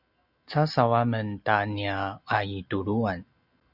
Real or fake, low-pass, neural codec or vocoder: real; 5.4 kHz; none